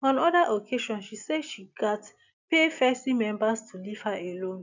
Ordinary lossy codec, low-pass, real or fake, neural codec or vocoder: none; 7.2 kHz; real; none